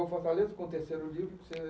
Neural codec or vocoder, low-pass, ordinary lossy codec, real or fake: none; none; none; real